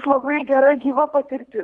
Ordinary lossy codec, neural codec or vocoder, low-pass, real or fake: Opus, 64 kbps; codec, 24 kHz, 3 kbps, HILCodec; 10.8 kHz; fake